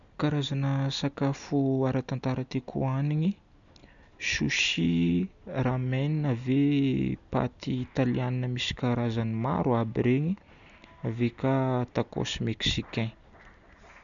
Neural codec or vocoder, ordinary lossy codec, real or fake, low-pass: none; none; real; 7.2 kHz